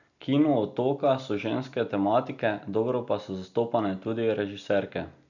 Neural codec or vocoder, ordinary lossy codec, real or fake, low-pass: none; none; real; 7.2 kHz